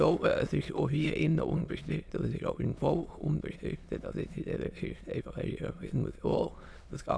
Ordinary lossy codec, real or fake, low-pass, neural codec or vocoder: none; fake; none; autoencoder, 22.05 kHz, a latent of 192 numbers a frame, VITS, trained on many speakers